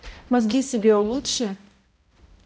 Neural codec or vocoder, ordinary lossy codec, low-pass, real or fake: codec, 16 kHz, 0.5 kbps, X-Codec, HuBERT features, trained on balanced general audio; none; none; fake